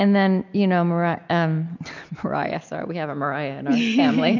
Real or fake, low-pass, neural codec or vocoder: real; 7.2 kHz; none